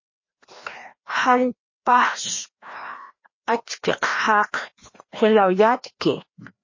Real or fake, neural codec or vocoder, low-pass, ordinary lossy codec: fake; codec, 16 kHz, 1 kbps, FreqCodec, larger model; 7.2 kHz; MP3, 32 kbps